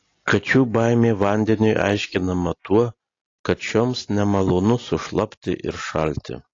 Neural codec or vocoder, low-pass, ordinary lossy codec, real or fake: none; 7.2 kHz; AAC, 32 kbps; real